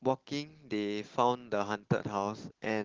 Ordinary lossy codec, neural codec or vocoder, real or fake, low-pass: Opus, 16 kbps; none; real; 7.2 kHz